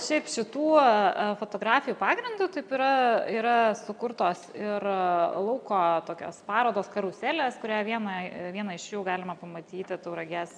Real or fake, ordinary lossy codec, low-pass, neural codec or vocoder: real; MP3, 96 kbps; 9.9 kHz; none